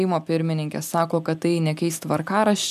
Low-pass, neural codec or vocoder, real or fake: 14.4 kHz; none; real